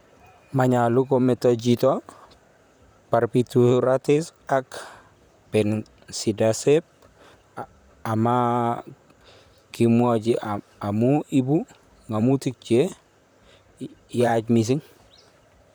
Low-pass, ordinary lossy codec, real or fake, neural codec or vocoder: none; none; fake; vocoder, 44.1 kHz, 128 mel bands, Pupu-Vocoder